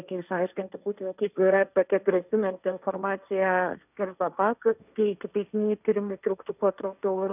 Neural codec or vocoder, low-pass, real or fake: codec, 16 kHz, 1.1 kbps, Voila-Tokenizer; 3.6 kHz; fake